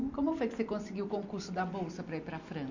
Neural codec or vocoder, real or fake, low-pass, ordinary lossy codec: none; real; 7.2 kHz; none